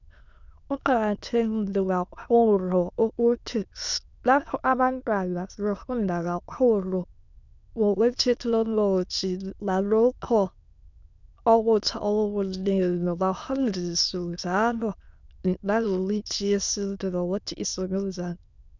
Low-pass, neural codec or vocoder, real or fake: 7.2 kHz; autoencoder, 22.05 kHz, a latent of 192 numbers a frame, VITS, trained on many speakers; fake